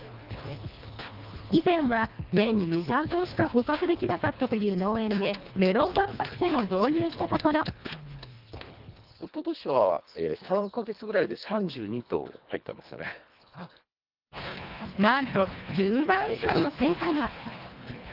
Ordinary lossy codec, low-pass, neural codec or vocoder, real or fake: Opus, 24 kbps; 5.4 kHz; codec, 24 kHz, 1.5 kbps, HILCodec; fake